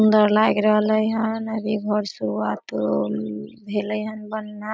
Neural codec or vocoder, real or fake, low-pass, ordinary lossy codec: none; real; none; none